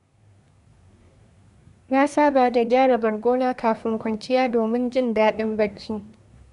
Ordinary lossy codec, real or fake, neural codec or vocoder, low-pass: none; fake; codec, 24 kHz, 1 kbps, SNAC; 10.8 kHz